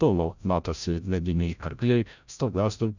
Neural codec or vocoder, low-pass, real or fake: codec, 16 kHz, 0.5 kbps, FreqCodec, larger model; 7.2 kHz; fake